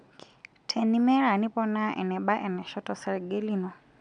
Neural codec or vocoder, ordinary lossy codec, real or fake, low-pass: none; none; real; 9.9 kHz